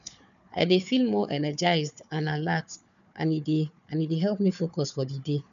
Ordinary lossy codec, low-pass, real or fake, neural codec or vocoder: none; 7.2 kHz; fake; codec, 16 kHz, 4 kbps, FunCodec, trained on Chinese and English, 50 frames a second